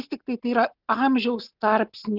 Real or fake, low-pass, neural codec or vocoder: real; 5.4 kHz; none